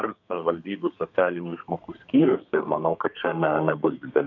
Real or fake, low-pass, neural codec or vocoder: fake; 7.2 kHz; codec, 32 kHz, 1.9 kbps, SNAC